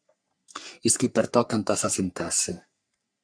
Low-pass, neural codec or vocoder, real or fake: 9.9 kHz; codec, 44.1 kHz, 3.4 kbps, Pupu-Codec; fake